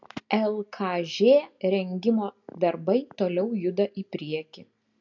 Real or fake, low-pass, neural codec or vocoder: real; 7.2 kHz; none